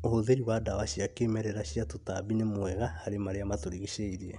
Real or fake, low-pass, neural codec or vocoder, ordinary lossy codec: real; 10.8 kHz; none; none